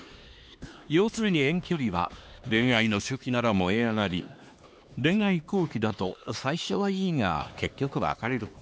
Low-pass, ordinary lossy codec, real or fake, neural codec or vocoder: none; none; fake; codec, 16 kHz, 2 kbps, X-Codec, HuBERT features, trained on LibriSpeech